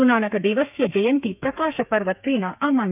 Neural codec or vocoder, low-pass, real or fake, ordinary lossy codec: codec, 32 kHz, 1.9 kbps, SNAC; 3.6 kHz; fake; none